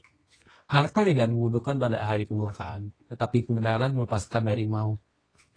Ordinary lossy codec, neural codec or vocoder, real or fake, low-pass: AAC, 32 kbps; codec, 24 kHz, 0.9 kbps, WavTokenizer, medium music audio release; fake; 9.9 kHz